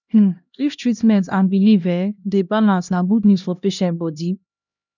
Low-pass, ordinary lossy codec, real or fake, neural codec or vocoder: 7.2 kHz; none; fake; codec, 16 kHz, 1 kbps, X-Codec, HuBERT features, trained on LibriSpeech